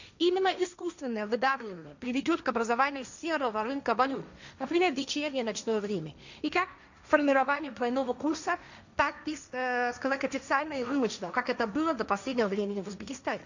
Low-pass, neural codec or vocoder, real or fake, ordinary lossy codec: 7.2 kHz; codec, 16 kHz, 1.1 kbps, Voila-Tokenizer; fake; none